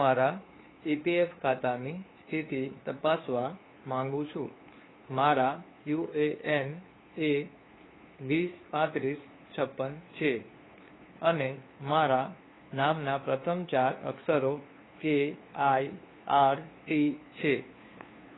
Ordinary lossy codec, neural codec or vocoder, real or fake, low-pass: AAC, 16 kbps; codec, 16 kHz, 2 kbps, FunCodec, trained on LibriTTS, 25 frames a second; fake; 7.2 kHz